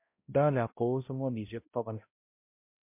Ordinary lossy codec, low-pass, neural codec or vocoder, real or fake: MP3, 32 kbps; 3.6 kHz; codec, 16 kHz, 0.5 kbps, X-Codec, HuBERT features, trained on balanced general audio; fake